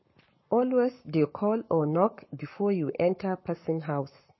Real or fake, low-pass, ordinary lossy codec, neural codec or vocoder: fake; 7.2 kHz; MP3, 24 kbps; codec, 44.1 kHz, 7.8 kbps, Pupu-Codec